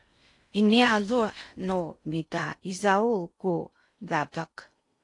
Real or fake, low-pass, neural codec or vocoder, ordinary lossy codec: fake; 10.8 kHz; codec, 16 kHz in and 24 kHz out, 0.6 kbps, FocalCodec, streaming, 4096 codes; AAC, 48 kbps